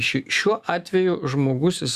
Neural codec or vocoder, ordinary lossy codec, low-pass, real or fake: autoencoder, 48 kHz, 128 numbers a frame, DAC-VAE, trained on Japanese speech; Opus, 64 kbps; 14.4 kHz; fake